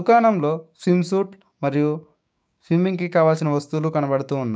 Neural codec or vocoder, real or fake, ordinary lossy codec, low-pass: codec, 16 kHz, 6 kbps, DAC; fake; none; none